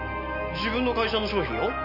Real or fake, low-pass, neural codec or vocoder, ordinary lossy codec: real; 5.4 kHz; none; MP3, 32 kbps